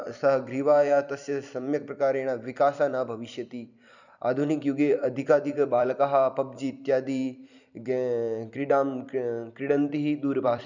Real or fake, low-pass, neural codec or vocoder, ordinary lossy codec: fake; 7.2 kHz; vocoder, 44.1 kHz, 128 mel bands every 256 samples, BigVGAN v2; none